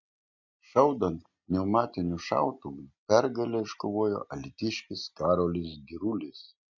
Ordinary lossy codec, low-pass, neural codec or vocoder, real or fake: MP3, 64 kbps; 7.2 kHz; none; real